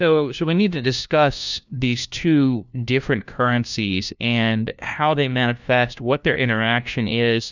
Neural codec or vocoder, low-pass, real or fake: codec, 16 kHz, 1 kbps, FunCodec, trained on LibriTTS, 50 frames a second; 7.2 kHz; fake